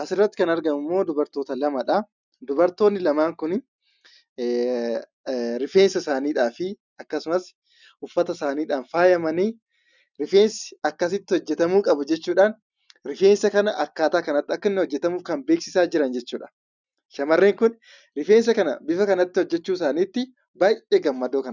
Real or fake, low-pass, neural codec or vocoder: real; 7.2 kHz; none